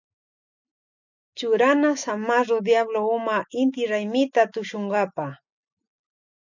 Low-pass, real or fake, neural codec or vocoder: 7.2 kHz; real; none